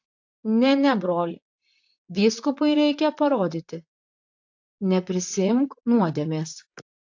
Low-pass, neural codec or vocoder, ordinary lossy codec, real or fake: 7.2 kHz; vocoder, 44.1 kHz, 128 mel bands, Pupu-Vocoder; AAC, 48 kbps; fake